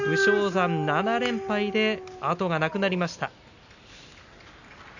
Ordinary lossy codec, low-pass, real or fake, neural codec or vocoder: none; 7.2 kHz; real; none